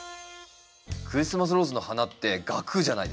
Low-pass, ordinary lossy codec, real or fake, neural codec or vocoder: none; none; real; none